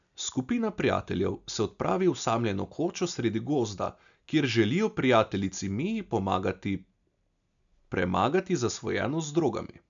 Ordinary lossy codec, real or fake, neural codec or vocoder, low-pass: none; real; none; 7.2 kHz